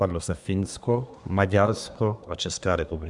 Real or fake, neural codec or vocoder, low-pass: fake; codec, 24 kHz, 1 kbps, SNAC; 10.8 kHz